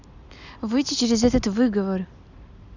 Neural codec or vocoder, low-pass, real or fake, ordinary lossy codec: none; 7.2 kHz; real; none